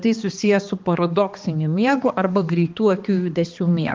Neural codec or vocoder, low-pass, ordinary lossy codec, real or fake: codec, 16 kHz, 2 kbps, X-Codec, HuBERT features, trained on balanced general audio; 7.2 kHz; Opus, 32 kbps; fake